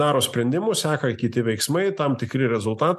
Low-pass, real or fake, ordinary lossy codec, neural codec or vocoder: 14.4 kHz; real; MP3, 96 kbps; none